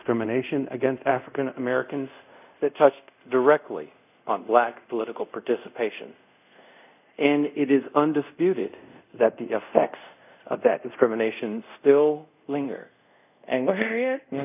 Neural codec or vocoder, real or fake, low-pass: codec, 24 kHz, 0.5 kbps, DualCodec; fake; 3.6 kHz